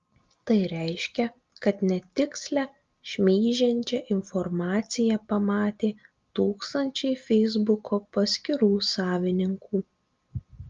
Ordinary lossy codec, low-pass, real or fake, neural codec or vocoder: Opus, 32 kbps; 7.2 kHz; real; none